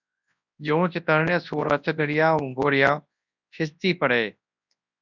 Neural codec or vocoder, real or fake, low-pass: codec, 24 kHz, 0.9 kbps, WavTokenizer, large speech release; fake; 7.2 kHz